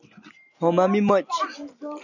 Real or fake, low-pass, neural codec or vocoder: real; 7.2 kHz; none